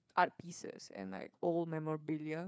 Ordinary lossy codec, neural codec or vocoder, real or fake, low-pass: none; codec, 16 kHz, 4 kbps, FreqCodec, larger model; fake; none